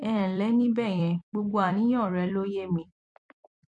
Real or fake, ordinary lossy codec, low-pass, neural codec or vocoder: fake; MP3, 48 kbps; 10.8 kHz; vocoder, 44.1 kHz, 128 mel bands every 256 samples, BigVGAN v2